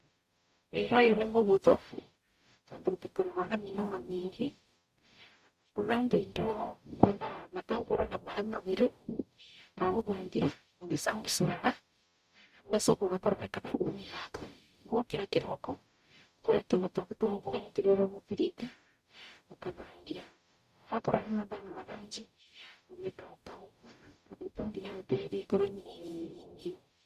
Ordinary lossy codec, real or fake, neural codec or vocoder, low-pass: Opus, 64 kbps; fake; codec, 44.1 kHz, 0.9 kbps, DAC; 14.4 kHz